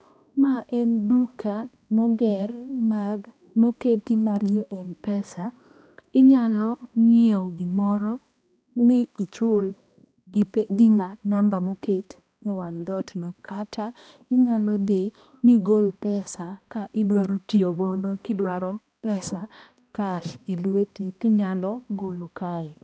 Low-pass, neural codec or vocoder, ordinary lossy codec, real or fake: none; codec, 16 kHz, 1 kbps, X-Codec, HuBERT features, trained on balanced general audio; none; fake